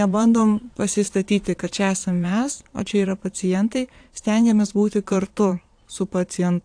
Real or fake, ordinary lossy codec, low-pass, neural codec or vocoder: fake; AAC, 64 kbps; 9.9 kHz; vocoder, 22.05 kHz, 80 mel bands, Vocos